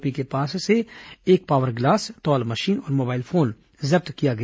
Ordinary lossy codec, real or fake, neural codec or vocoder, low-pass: none; real; none; none